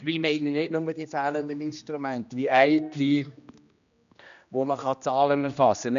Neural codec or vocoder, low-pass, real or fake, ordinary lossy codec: codec, 16 kHz, 1 kbps, X-Codec, HuBERT features, trained on general audio; 7.2 kHz; fake; none